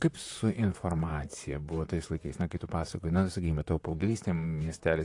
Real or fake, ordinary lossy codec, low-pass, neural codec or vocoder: fake; AAC, 48 kbps; 10.8 kHz; vocoder, 44.1 kHz, 128 mel bands, Pupu-Vocoder